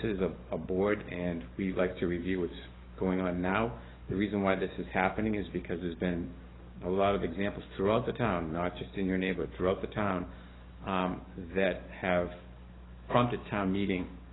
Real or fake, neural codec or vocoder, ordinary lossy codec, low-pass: fake; codec, 44.1 kHz, 7.8 kbps, DAC; AAC, 16 kbps; 7.2 kHz